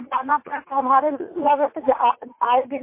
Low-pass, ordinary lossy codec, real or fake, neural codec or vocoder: 3.6 kHz; MP3, 24 kbps; fake; vocoder, 44.1 kHz, 80 mel bands, Vocos